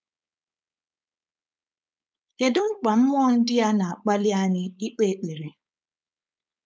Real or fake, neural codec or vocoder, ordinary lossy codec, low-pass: fake; codec, 16 kHz, 4.8 kbps, FACodec; none; none